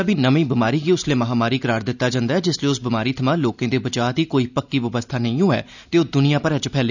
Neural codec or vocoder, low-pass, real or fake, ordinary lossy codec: none; 7.2 kHz; real; none